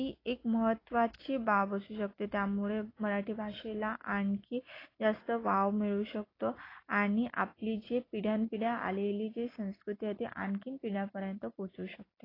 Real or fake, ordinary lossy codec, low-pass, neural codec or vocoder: real; AAC, 24 kbps; 5.4 kHz; none